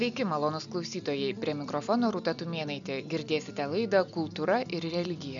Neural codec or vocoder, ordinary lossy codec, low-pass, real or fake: none; MP3, 96 kbps; 7.2 kHz; real